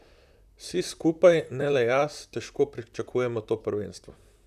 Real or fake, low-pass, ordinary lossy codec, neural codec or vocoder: fake; 14.4 kHz; none; vocoder, 44.1 kHz, 128 mel bands, Pupu-Vocoder